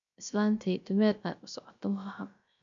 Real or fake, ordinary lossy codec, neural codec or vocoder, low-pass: fake; none; codec, 16 kHz, 0.3 kbps, FocalCodec; 7.2 kHz